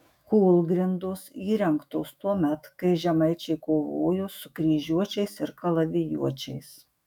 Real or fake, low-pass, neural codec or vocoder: fake; 19.8 kHz; autoencoder, 48 kHz, 128 numbers a frame, DAC-VAE, trained on Japanese speech